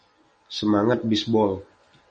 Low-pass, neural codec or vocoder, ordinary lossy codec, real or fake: 10.8 kHz; none; MP3, 32 kbps; real